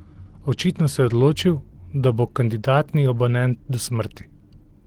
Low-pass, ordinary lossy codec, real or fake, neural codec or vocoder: 19.8 kHz; Opus, 16 kbps; real; none